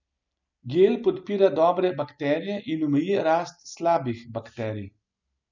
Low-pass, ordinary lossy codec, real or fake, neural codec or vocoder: 7.2 kHz; none; real; none